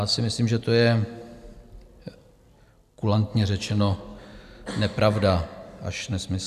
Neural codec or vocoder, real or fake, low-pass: none; real; 14.4 kHz